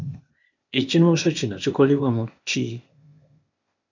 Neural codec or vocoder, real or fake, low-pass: codec, 16 kHz, 0.8 kbps, ZipCodec; fake; 7.2 kHz